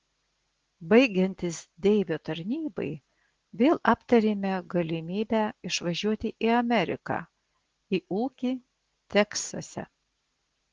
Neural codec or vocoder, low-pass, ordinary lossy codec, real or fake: none; 7.2 kHz; Opus, 16 kbps; real